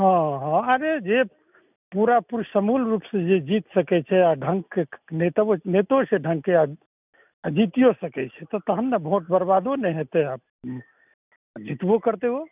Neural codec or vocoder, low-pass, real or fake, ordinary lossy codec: none; 3.6 kHz; real; none